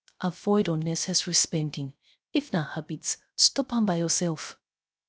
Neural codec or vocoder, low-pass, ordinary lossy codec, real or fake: codec, 16 kHz, 0.3 kbps, FocalCodec; none; none; fake